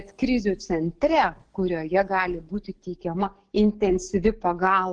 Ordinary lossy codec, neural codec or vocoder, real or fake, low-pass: Opus, 16 kbps; codec, 44.1 kHz, 7.8 kbps, DAC; fake; 9.9 kHz